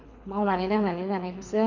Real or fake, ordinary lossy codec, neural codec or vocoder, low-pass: fake; none; codec, 24 kHz, 6 kbps, HILCodec; 7.2 kHz